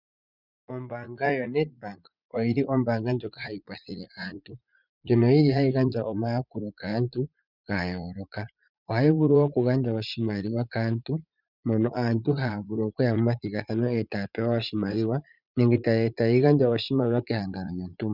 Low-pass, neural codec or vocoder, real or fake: 5.4 kHz; vocoder, 44.1 kHz, 80 mel bands, Vocos; fake